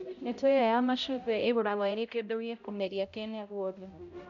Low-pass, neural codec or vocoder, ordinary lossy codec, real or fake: 7.2 kHz; codec, 16 kHz, 0.5 kbps, X-Codec, HuBERT features, trained on balanced general audio; none; fake